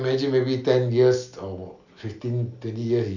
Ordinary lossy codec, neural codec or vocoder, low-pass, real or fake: none; none; 7.2 kHz; real